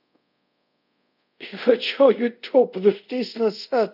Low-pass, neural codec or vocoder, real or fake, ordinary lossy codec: 5.4 kHz; codec, 24 kHz, 0.5 kbps, DualCodec; fake; none